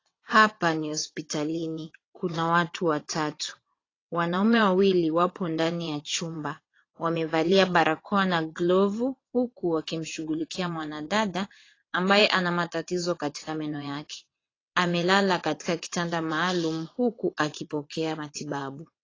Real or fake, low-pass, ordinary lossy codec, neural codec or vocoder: fake; 7.2 kHz; AAC, 32 kbps; vocoder, 22.05 kHz, 80 mel bands, WaveNeXt